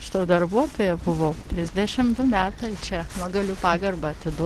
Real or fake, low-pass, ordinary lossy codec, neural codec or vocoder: real; 14.4 kHz; Opus, 16 kbps; none